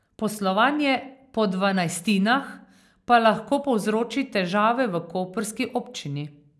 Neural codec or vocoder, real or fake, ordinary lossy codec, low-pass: none; real; none; none